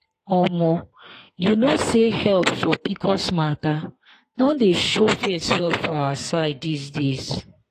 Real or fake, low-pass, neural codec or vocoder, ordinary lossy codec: fake; 14.4 kHz; codec, 32 kHz, 1.9 kbps, SNAC; AAC, 48 kbps